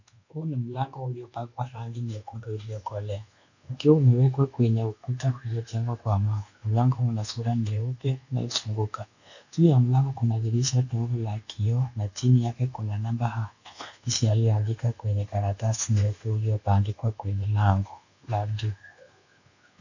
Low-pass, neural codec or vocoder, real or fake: 7.2 kHz; codec, 24 kHz, 1.2 kbps, DualCodec; fake